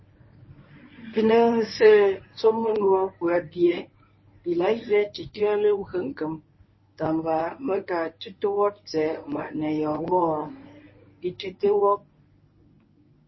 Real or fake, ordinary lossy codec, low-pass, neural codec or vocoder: fake; MP3, 24 kbps; 7.2 kHz; codec, 24 kHz, 0.9 kbps, WavTokenizer, medium speech release version 1